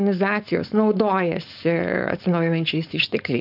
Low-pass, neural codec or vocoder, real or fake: 5.4 kHz; codec, 16 kHz, 4.8 kbps, FACodec; fake